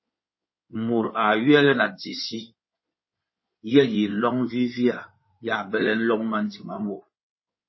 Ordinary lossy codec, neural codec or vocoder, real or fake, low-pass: MP3, 24 kbps; codec, 16 kHz in and 24 kHz out, 2.2 kbps, FireRedTTS-2 codec; fake; 7.2 kHz